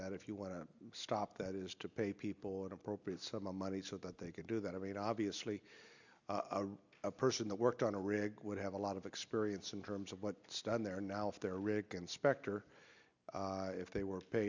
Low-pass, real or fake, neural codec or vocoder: 7.2 kHz; real; none